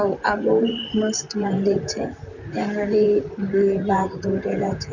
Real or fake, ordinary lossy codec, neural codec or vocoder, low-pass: fake; none; vocoder, 44.1 kHz, 128 mel bands, Pupu-Vocoder; 7.2 kHz